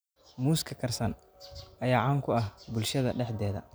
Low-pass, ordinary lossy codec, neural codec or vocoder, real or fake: none; none; none; real